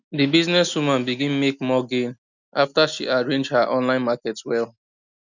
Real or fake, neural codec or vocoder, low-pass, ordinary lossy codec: real; none; 7.2 kHz; none